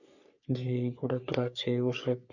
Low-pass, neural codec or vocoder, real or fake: 7.2 kHz; codec, 44.1 kHz, 3.4 kbps, Pupu-Codec; fake